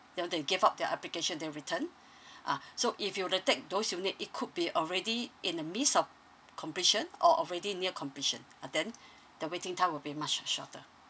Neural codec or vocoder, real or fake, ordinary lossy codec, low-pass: none; real; none; none